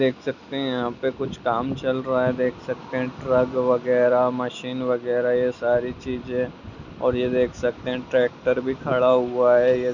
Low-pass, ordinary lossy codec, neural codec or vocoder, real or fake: 7.2 kHz; none; none; real